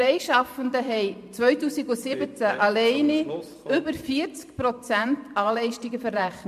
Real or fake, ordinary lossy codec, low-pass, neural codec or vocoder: fake; MP3, 64 kbps; 14.4 kHz; vocoder, 44.1 kHz, 128 mel bands every 512 samples, BigVGAN v2